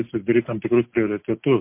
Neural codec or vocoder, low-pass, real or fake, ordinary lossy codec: none; 3.6 kHz; real; MP3, 32 kbps